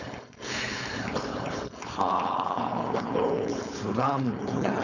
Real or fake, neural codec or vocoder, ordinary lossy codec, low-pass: fake; codec, 16 kHz, 4.8 kbps, FACodec; none; 7.2 kHz